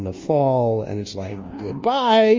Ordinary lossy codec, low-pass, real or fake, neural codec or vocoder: Opus, 32 kbps; 7.2 kHz; fake; codec, 24 kHz, 1.2 kbps, DualCodec